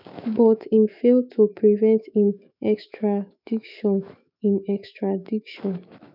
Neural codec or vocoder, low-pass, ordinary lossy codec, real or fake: autoencoder, 48 kHz, 128 numbers a frame, DAC-VAE, trained on Japanese speech; 5.4 kHz; none; fake